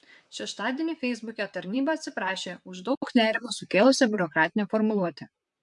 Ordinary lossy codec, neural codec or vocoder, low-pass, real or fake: MP3, 64 kbps; vocoder, 44.1 kHz, 128 mel bands, Pupu-Vocoder; 10.8 kHz; fake